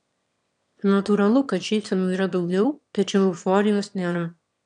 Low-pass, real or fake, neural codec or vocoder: 9.9 kHz; fake; autoencoder, 22.05 kHz, a latent of 192 numbers a frame, VITS, trained on one speaker